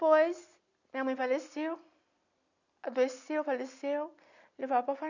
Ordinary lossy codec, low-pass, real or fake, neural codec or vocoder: none; 7.2 kHz; real; none